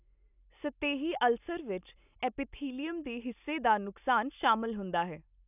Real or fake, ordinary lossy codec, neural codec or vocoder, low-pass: real; none; none; 3.6 kHz